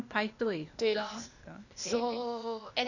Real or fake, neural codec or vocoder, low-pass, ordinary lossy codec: fake; codec, 16 kHz, 0.8 kbps, ZipCodec; 7.2 kHz; none